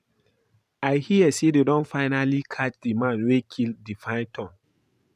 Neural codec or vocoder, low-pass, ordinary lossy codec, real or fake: vocoder, 44.1 kHz, 128 mel bands every 512 samples, BigVGAN v2; 14.4 kHz; none; fake